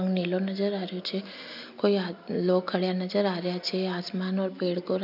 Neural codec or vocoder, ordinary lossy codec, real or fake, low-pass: none; none; real; 5.4 kHz